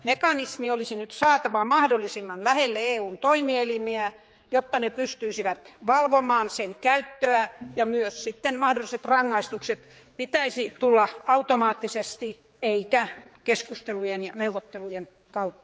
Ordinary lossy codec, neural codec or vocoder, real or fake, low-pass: none; codec, 16 kHz, 4 kbps, X-Codec, HuBERT features, trained on general audio; fake; none